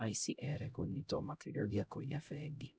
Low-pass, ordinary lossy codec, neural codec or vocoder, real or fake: none; none; codec, 16 kHz, 0.5 kbps, X-Codec, HuBERT features, trained on LibriSpeech; fake